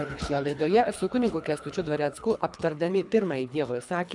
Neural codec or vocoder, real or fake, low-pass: codec, 24 kHz, 3 kbps, HILCodec; fake; 10.8 kHz